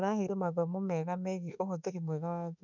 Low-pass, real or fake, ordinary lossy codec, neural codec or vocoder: 7.2 kHz; fake; none; autoencoder, 48 kHz, 32 numbers a frame, DAC-VAE, trained on Japanese speech